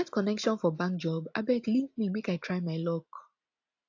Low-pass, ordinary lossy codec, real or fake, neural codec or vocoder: 7.2 kHz; AAC, 48 kbps; real; none